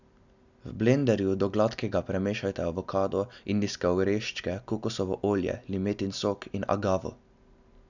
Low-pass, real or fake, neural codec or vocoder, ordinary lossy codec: 7.2 kHz; real; none; none